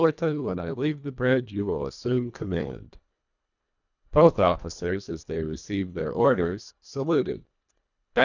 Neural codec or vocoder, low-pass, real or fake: codec, 24 kHz, 1.5 kbps, HILCodec; 7.2 kHz; fake